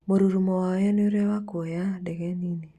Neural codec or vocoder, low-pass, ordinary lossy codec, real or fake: none; 14.4 kHz; Opus, 64 kbps; real